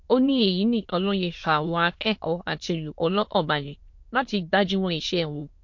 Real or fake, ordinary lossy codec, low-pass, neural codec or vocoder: fake; MP3, 48 kbps; 7.2 kHz; autoencoder, 22.05 kHz, a latent of 192 numbers a frame, VITS, trained on many speakers